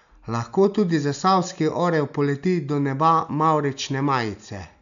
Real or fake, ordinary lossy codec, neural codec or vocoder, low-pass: real; none; none; 7.2 kHz